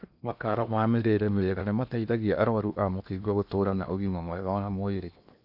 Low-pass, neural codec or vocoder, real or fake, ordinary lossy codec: 5.4 kHz; codec, 16 kHz in and 24 kHz out, 0.8 kbps, FocalCodec, streaming, 65536 codes; fake; none